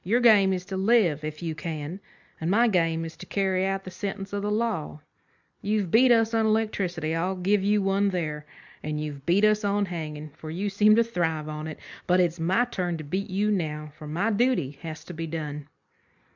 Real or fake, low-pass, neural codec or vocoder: real; 7.2 kHz; none